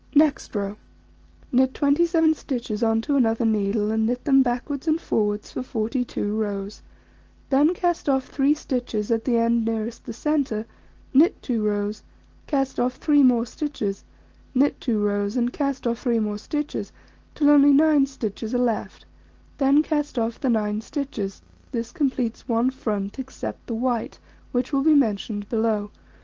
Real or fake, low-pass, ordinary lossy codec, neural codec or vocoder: real; 7.2 kHz; Opus, 16 kbps; none